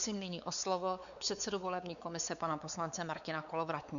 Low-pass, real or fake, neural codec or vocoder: 7.2 kHz; fake; codec, 16 kHz, 4 kbps, X-Codec, WavLM features, trained on Multilingual LibriSpeech